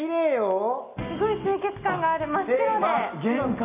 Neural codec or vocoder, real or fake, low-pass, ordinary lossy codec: none; real; 3.6 kHz; none